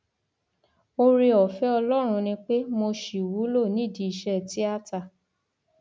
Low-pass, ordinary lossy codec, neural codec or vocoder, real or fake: none; none; none; real